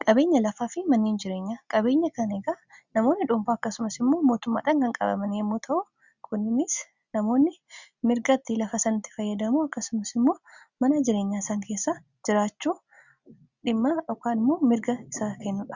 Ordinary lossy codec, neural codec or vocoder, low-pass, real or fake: Opus, 64 kbps; none; 7.2 kHz; real